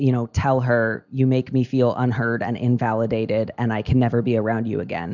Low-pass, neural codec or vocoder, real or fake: 7.2 kHz; none; real